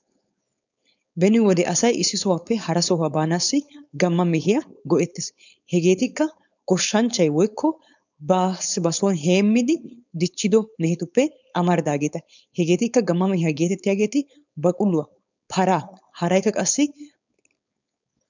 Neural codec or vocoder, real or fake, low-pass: codec, 16 kHz, 4.8 kbps, FACodec; fake; 7.2 kHz